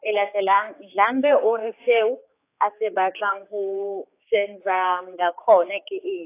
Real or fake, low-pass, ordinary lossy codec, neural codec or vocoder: fake; 3.6 kHz; AAC, 24 kbps; codec, 16 kHz, 4 kbps, X-Codec, HuBERT features, trained on general audio